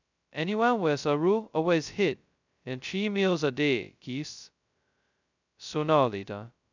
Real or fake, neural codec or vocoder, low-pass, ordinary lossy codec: fake; codec, 16 kHz, 0.2 kbps, FocalCodec; 7.2 kHz; none